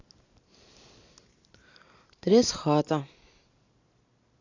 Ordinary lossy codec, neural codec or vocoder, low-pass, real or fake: AAC, 48 kbps; none; 7.2 kHz; real